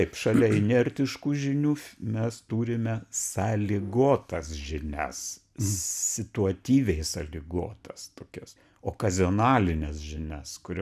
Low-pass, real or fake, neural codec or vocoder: 14.4 kHz; real; none